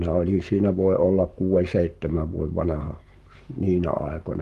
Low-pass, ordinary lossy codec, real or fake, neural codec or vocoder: 14.4 kHz; Opus, 32 kbps; fake; codec, 44.1 kHz, 7.8 kbps, Pupu-Codec